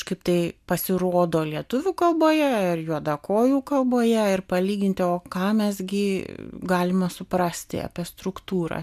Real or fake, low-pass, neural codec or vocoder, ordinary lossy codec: real; 14.4 kHz; none; MP3, 96 kbps